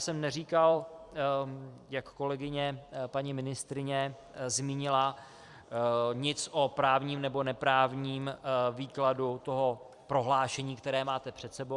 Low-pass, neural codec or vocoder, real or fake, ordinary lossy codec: 10.8 kHz; vocoder, 44.1 kHz, 128 mel bands every 256 samples, BigVGAN v2; fake; Opus, 64 kbps